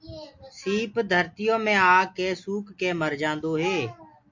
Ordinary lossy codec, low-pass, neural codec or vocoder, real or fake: MP3, 48 kbps; 7.2 kHz; none; real